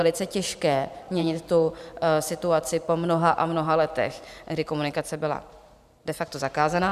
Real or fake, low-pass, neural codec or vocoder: fake; 14.4 kHz; vocoder, 44.1 kHz, 128 mel bands every 512 samples, BigVGAN v2